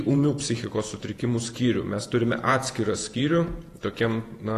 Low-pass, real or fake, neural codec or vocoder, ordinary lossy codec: 14.4 kHz; fake; vocoder, 48 kHz, 128 mel bands, Vocos; AAC, 48 kbps